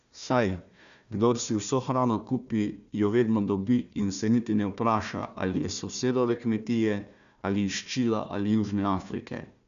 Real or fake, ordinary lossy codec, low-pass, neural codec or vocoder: fake; none; 7.2 kHz; codec, 16 kHz, 1 kbps, FunCodec, trained on Chinese and English, 50 frames a second